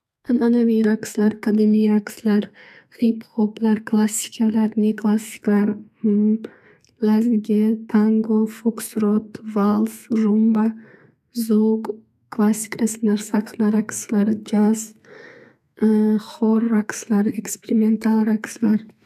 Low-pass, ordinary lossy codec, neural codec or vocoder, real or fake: 14.4 kHz; none; codec, 32 kHz, 1.9 kbps, SNAC; fake